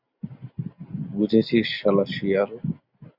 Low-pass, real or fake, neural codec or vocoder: 5.4 kHz; real; none